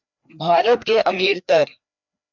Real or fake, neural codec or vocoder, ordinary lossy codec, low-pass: fake; codec, 16 kHz, 2 kbps, FreqCodec, larger model; MP3, 64 kbps; 7.2 kHz